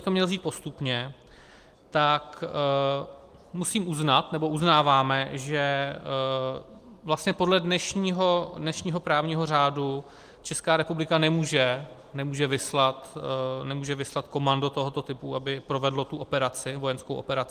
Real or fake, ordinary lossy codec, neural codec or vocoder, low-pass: real; Opus, 24 kbps; none; 14.4 kHz